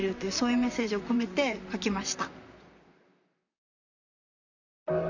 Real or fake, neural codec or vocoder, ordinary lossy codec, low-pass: fake; vocoder, 44.1 kHz, 128 mel bands, Pupu-Vocoder; none; 7.2 kHz